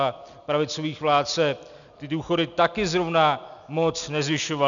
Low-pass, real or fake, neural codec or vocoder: 7.2 kHz; real; none